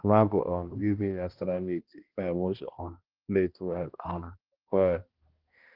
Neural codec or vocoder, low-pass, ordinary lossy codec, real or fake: codec, 16 kHz, 1 kbps, X-Codec, HuBERT features, trained on balanced general audio; 5.4 kHz; Opus, 16 kbps; fake